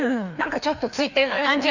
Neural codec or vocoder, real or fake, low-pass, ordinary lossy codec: codec, 16 kHz, 2 kbps, FreqCodec, larger model; fake; 7.2 kHz; none